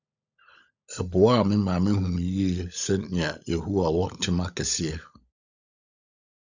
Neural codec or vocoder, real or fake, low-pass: codec, 16 kHz, 16 kbps, FunCodec, trained on LibriTTS, 50 frames a second; fake; 7.2 kHz